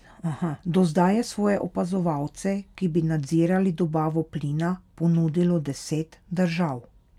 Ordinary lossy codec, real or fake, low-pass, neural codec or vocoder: none; real; 19.8 kHz; none